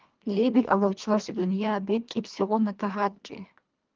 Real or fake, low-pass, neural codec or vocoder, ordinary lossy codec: fake; 7.2 kHz; codec, 24 kHz, 1.5 kbps, HILCodec; Opus, 32 kbps